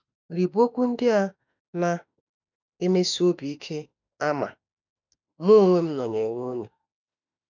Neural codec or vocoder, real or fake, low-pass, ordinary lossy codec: autoencoder, 48 kHz, 32 numbers a frame, DAC-VAE, trained on Japanese speech; fake; 7.2 kHz; none